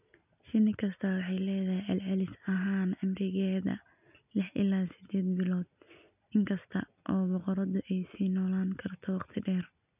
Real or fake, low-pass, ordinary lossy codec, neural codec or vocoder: real; 3.6 kHz; none; none